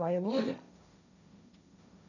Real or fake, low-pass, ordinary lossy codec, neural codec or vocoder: fake; 7.2 kHz; none; codec, 16 kHz, 1.1 kbps, Voila-Tokenizer